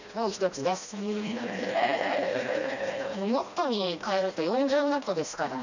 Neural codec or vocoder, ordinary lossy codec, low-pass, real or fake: codec, 16 kHz, 1 kbps, FreqCodec, smaller model; none; 7.2 kHz; fake